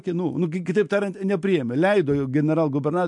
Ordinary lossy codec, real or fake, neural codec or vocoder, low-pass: MP3, 64 kbps; real; none; 10.8 kHz